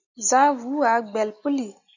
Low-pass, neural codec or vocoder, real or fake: 7.2 kHz; none; real